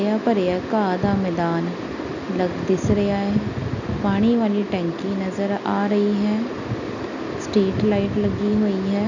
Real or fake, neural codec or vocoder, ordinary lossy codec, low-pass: real; none; none; 7.2 kHz